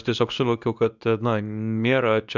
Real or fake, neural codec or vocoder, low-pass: fake; codec, 24 kHz, 0.9 kbps, WavTokenizer, medium speech release version 2; 7.2 kHz